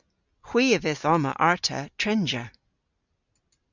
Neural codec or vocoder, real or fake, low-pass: none; real; 7.2 kHz